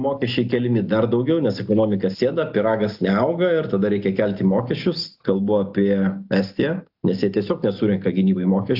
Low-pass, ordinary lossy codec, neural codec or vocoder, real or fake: 5.4 kHz; AAC, 48 kbps; none; real